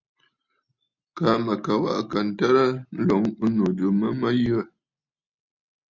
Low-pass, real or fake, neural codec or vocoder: 7.2 kHz; real; none